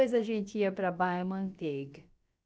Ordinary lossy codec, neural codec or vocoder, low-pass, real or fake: none; codec, 16 kHz, about 1 kbps, DyCAST, with the encoder's durations; none; fake